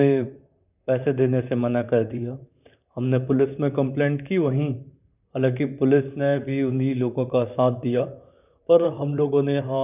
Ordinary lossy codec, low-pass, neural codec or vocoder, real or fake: none; 3.6 kHz; vocoder, 44.1 kHz, 128 mel bands, Pupu-Vocoder; fake